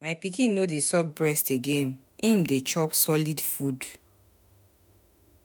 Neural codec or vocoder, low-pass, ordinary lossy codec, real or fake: autoencoder, 48 kHz, 32 numbers a frame, DAC-VAE, trained on Japanese speech; none; none; fake